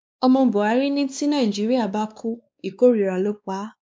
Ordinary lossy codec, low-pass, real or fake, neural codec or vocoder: none; none; fake; codec, 16 kHz, 2 kbps, X-Codec, WavLM features, trained on Multilingual LibriSpeech